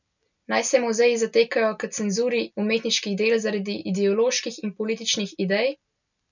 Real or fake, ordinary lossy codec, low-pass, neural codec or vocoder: real; none; 7.2 kHz; none